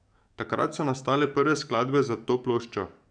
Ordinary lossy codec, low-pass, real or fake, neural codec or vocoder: none; 9.9 kHz; fake; codec, 44.1 kHz, 7.8 kbps, DAC